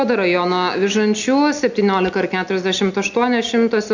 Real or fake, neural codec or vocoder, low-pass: real; none; 7.2 kHz